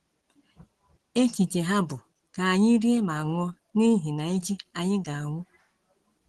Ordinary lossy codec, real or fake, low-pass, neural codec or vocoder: Opus, 16 kbps; real; 10.8 kHz; none